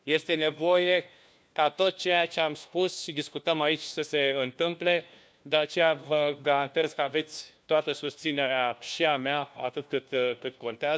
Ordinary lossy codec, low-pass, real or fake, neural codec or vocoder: none; none; fake; codec, 16 kHz, 1 kbps, FunCodec, trained on LibriTTS, 50 frames a second